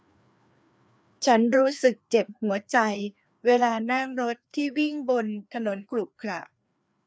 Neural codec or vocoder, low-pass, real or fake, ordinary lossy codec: codec, 16 kHz, 2 kbps, FreqCodec, larger model; none; fake; none